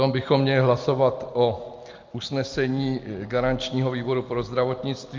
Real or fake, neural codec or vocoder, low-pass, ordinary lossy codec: real; none; 7.2 kHz; Opus, 24 kbps